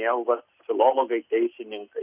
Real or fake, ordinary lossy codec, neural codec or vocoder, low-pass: real; Opus, 64 kbps; none; 3.6 kHz